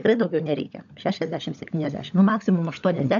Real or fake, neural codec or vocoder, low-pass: fake; codec, 16 kHz, 16 kbps, FunCodec, trained on LibriTTS, 50 frames a second; 7.2 kHz